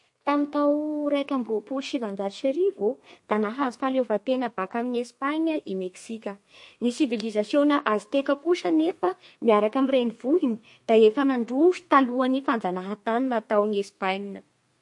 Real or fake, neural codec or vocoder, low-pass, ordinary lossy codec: fake; codec, 32 kHz, 1.9 kbps, SNAC; 10.8 kHz; MP3, 48 kbps